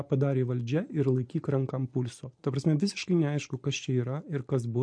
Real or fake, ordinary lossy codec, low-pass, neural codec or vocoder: real; MP3, 48 kbps; 9.9 kHz; none